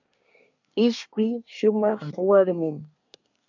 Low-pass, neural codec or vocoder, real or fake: 7.2 kHz; codec, 24 kHz, 1 kbps, SNAC; fake